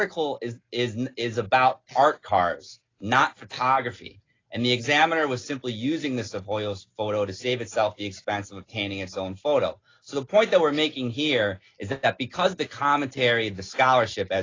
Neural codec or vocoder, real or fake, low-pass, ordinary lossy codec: none; real; 7.2 kHz; AAC, 32 kbps